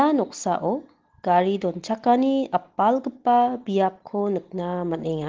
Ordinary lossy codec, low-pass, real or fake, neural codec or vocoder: Opus, 16 kbps; 7.2 kHz; real; none